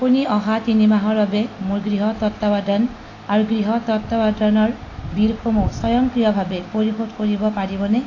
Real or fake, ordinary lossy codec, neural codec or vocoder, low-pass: real; AAC, 32 kbps; none; 7.2 kHz